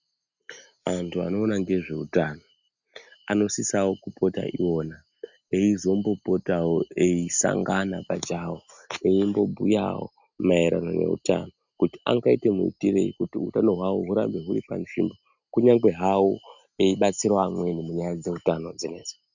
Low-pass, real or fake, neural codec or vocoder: 7.2 kHz; real; none